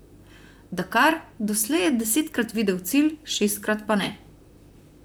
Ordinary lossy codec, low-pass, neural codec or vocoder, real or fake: none; none; vocoder, 44.1 kHz, 128 mel bands, Pupu-Vocoder; fake